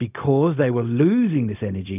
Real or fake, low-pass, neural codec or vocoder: fake; 3.6 kHz; codec, 16 kHz, 0.4 kbps, LongCat-Audio-Codec